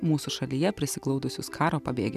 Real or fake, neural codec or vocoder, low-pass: real; none; 14.4 kHz